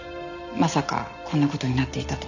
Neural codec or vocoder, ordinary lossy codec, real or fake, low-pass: none; none; real; 7.2 kHz